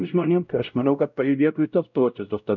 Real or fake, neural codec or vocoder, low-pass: fake; codec, 16 kHz, 0.5 kbps, X-Codec, WavLM features, trained on Multilingual LibriSpeech; 7.2 kHz